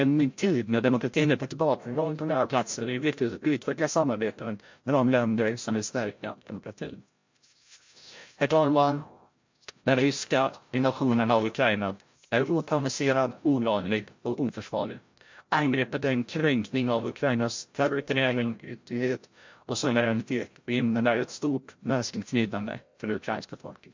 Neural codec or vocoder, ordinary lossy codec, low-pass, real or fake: codec, 16 kHz, 0.5 kbps, FreqCodec, larger model; MP3, 48 kbps; 7.2 kHz; fake